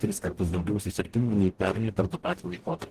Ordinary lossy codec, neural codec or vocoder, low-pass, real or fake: Opus, 16 kbps; codec, 44.1 kHz, 0.9 kbps, DAC; 14.4 kHz; fake